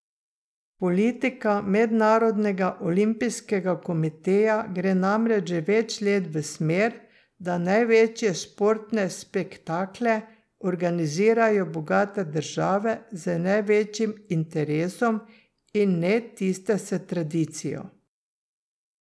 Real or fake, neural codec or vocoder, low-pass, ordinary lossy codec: real; none; none; none